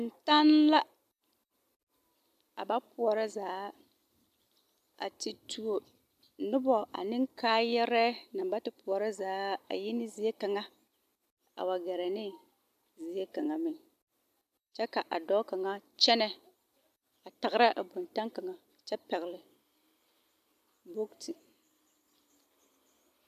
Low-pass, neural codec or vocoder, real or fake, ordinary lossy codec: 14.4 kHz; none; real; AAC, 96 kbps